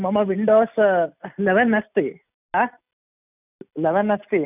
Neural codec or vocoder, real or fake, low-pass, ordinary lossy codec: none; real; 3.6 kHz; none